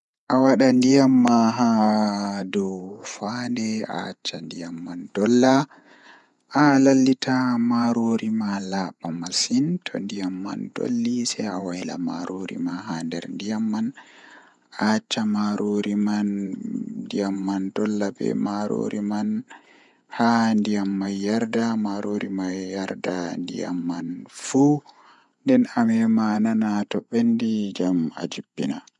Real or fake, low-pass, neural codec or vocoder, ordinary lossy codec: fake; 10.8 kHz; vocoder, 48 kHz, 128 mel bands, Vocos; none